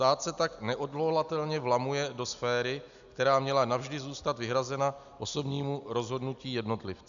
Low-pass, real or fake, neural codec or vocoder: 7.2 kHz; real; none